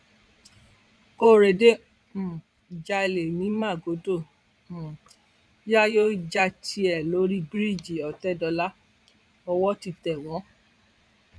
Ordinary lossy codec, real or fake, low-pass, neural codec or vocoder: none; fake; none; vocoder, 22.05 kHz, 80 mel bands, Vocos